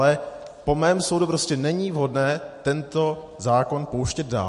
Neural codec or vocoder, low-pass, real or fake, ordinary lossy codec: none; 14.4 kHz; real; MP3, 48 kbps